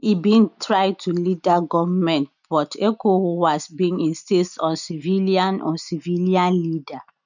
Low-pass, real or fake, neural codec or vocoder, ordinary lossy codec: 7.2 kHz; real; none; none